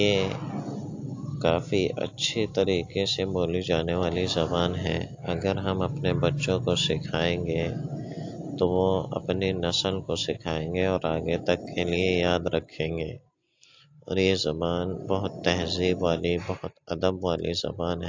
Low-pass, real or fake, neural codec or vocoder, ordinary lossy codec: 7.2 kHz; real; none; AAC, 48 kbps